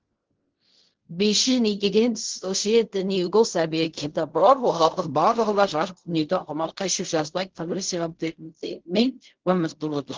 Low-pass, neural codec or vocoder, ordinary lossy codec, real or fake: 7.2 kHz; codec, 16 kHz in and 24 kHz out, 0.4 kbps, LongCat-Audio-Codec, fine tuned four codebook decoder; Opus, 16 kbps; fake